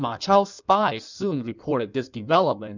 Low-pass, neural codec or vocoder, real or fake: 7.2 kHz; codec, 16 kHz in and 24 kHz out, 1.1 kbps, FireRedTTS-2 codec; fake